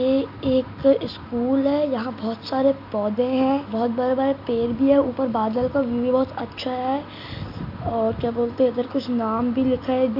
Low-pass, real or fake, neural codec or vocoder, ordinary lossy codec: 5.4 kHz; real; none; AAC, 48 kbps